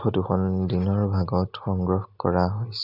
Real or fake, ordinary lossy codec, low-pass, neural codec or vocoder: real; none; 5.4 kHz; none